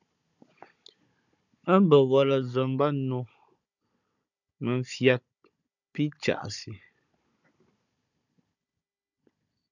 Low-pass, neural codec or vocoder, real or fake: 7.2 kHz; codec, 16 kHz, 16 kbps, FunCodec, trained on Chinese and English, 50 frames a second; fake